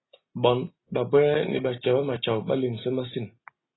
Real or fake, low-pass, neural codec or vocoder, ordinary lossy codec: real; 7.2 kHz; none; AAC, 16 kbps